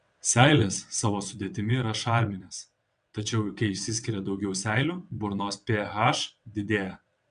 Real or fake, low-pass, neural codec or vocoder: fake; 9.9 kHz; vocoder, 22.05 kHz, 80 mel bands, WaveNeXt